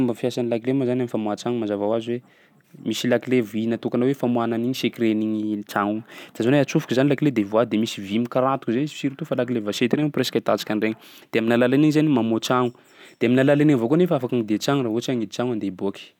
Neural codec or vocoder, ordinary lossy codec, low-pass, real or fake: none; none; 19.8 kHz; real